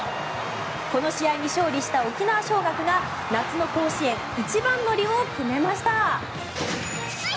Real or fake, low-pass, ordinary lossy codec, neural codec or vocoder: real; none; none; none